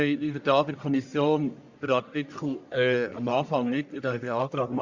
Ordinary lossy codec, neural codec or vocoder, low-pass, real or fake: Opus, 64 kbps; codec, 44.1 kHz, 1.7 kbps, Pupu-Codec; 7.2 kHz; fake